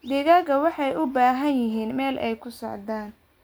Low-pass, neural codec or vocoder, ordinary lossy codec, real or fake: none; none; none; real